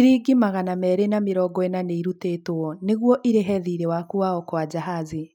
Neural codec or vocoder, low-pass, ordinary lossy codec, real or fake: none; 19.8 kHz; none; real